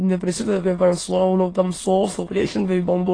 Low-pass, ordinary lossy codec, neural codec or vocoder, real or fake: 9.9 kHz; AAC, 32 kbps; autoencoder, 22.05 kHz, a latent of 192 numbers a frame, VITS, trained on many speakers; fake